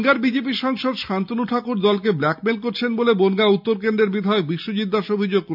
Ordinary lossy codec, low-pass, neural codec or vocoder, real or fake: none; 5.4 kHz; none; real